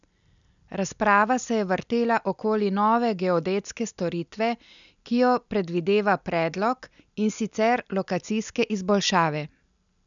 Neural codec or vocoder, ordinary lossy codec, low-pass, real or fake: none; none; 7.2 kHz; real